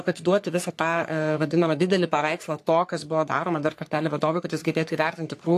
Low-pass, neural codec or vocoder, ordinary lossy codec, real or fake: 14.4 kHz; codec, 44.1 kHz, 3.4 kbps, Pupu-Codec; AAC, 64 kbps; fake